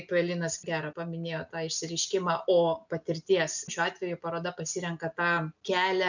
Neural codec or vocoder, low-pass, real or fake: none; 7.2 kHz; real